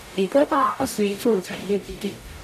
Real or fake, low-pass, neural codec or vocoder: fake; 14.4 kHz; codec, 44.1 kHz, 0.9 kbps, DAC